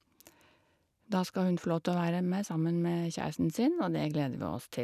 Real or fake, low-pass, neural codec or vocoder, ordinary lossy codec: real; 14.4 kHz; none; none